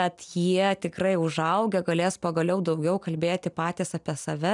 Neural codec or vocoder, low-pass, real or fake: none; 10.8 kHz; real